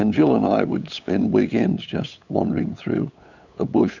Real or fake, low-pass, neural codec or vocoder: fake; 7.2 kHz; codec, 16 kHz, 8 kbps, FunCodec, trained on LibriTTS, 25 frames a second